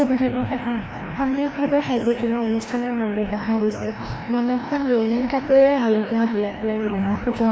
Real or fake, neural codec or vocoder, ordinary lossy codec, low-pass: fake; codec, 16 kHz, 1 kbps, FreqCodec, larger model; none; none